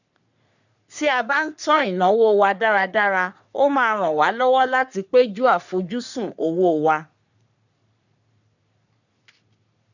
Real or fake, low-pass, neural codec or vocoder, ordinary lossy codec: fake; 7.2 kHz; codec, 44.1 kHz, 3.4 kbps, Pupu-Codec; none